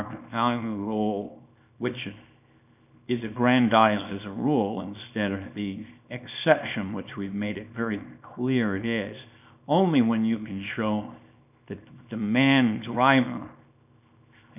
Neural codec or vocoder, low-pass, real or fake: codec, 24 kHz, 0.9 kbps, WavTokenizer, small release; 3.6 kHz; fake